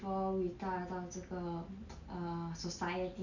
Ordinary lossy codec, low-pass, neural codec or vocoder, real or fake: none; 7.2 kHz; none; real